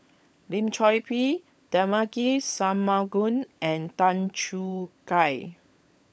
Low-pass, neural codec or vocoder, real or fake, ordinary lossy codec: none; codec, 16 kHz, 16 kbps, FunCodec, trained on LibriTTS, 50 frames a second; fake; none